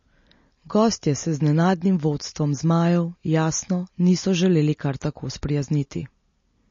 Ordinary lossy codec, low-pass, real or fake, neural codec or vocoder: MP3, 32 kbps; 7.2 kHz; real; none